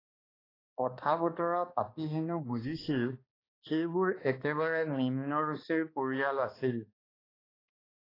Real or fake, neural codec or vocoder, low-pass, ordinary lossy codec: fake; codec, 16 kHz, 2 kbps, X-Codec, HuBERT features, trained on balanced general audio; 5.4 kHz; AAC, 24 kbps